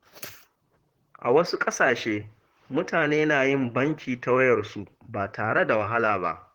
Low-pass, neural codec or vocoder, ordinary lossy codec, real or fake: 19.8 kHz; vocoder, 44.1 kHz, 128 mel bands, Pupu-Vocoder; Opus, 16 kbps; fake